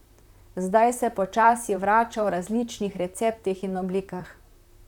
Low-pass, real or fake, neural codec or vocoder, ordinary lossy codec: 19.8 kHz; fake; vocoder, 44.1 kHz, 128 mel bands, Pupu-Vocoder; none